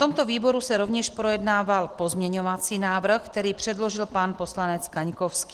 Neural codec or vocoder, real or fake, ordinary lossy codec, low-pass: none; real; Opus, 16 kbps; 14.4 kHz